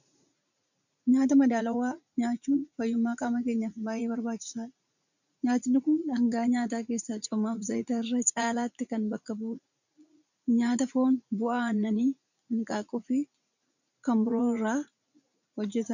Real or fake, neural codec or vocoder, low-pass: fake; vocoder, 44.1 kHz, 80 mel bands, Vocos; 7.2 kHz